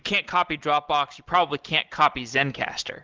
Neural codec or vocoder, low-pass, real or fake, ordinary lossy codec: none; 7.2 kHz; real; Opus, 16 kbps